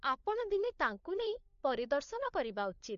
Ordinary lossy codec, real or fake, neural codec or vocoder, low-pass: MP3, 48 kbps; fake; codec, 16 kHz, 4 kbps, FunCodec, trained on LibriTTS, 50 frames a second; 7.2 kHz